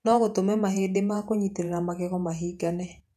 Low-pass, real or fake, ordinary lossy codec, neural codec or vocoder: 14.4 kHz; fake; none; vocoder, 48 kHz, 128 mel bands, Vocos